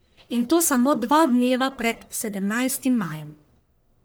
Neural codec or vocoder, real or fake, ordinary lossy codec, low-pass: codec, 44.1 kHz, 1.7 kbps, Pupu-Codec; fake; none; none